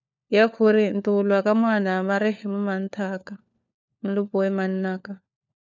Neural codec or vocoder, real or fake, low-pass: codec, 16 kHz, 4 kbps, FunCodec, trained on LibriTTS, 50 frames a second; fake; 7.2 kHz